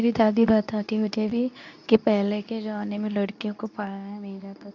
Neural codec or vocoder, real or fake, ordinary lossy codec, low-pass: codec, 24 kHz, 0.9 kbps, WavTokenizer, medium speech release version 2; fake; none; 7.2 kHz